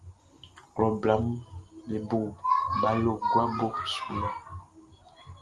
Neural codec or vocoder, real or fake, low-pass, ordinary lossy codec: none; real; 10.8 kHz; Opus, 32 kbps